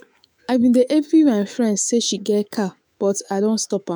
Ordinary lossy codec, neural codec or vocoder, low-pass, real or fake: none; autoencoder, 48 kHz, 128 numbers a frame, DAC-VAE, trained on Japanese speech; none; fake